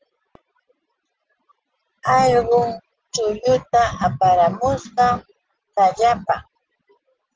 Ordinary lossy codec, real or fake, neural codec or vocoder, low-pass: Opus, 16 kbps; real; none; 7.2 kHz